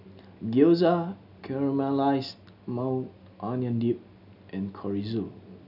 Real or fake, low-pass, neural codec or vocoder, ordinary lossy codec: real; 5.4 kHz; none; none